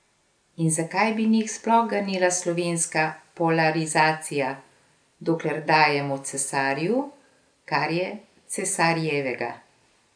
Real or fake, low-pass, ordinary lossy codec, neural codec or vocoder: real; 9.9 kHz; none; none